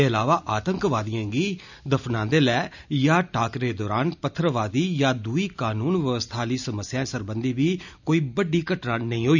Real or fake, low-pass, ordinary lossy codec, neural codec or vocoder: real; 7.2 kHz; none; none